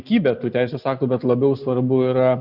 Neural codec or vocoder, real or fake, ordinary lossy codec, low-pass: none; real; Opus, 64 kbps; 5.4 kHz